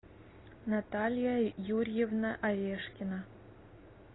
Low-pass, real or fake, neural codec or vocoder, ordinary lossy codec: 7.2 kHz; real; none; AAC, 16 kbps